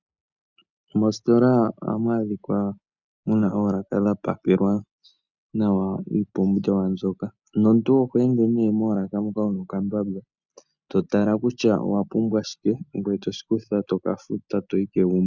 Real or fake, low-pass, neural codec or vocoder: real; 7.2 kHz; none